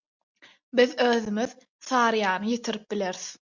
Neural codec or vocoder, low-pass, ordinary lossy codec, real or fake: none; 7.2 kHz; Opus, 64 kbps; real